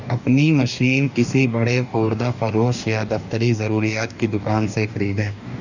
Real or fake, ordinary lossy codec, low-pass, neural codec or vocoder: fake; none; 7.2 kHz; codec, 44.1 kHz, 2.6 kbps, DAC